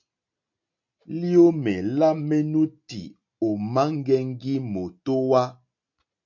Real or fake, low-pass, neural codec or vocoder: real; 7.2 kHz; none